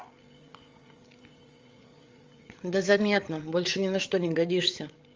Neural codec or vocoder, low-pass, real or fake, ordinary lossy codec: codec, 16 kHz, 8 kbps, FreqCodec, larger model; 7.2 kHz; fake; Opus, 32 kbps